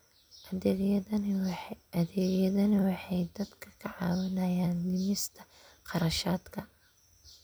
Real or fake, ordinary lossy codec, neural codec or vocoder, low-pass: real; none; none; none